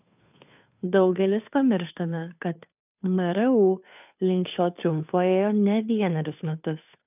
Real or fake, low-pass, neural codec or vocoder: fake; 3.6 kHz; codec, 16 kHz, 2 kbps, FunCodec, trained on Chinese and English, 25 frames a second